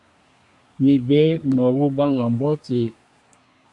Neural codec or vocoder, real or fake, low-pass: codec, 24 kHz, 1 kbps, SNAC; fake; 10.8 kHz